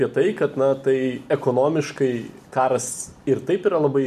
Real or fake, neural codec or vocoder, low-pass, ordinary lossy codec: real; none; 14.4 kHz; AAC, 96 kbps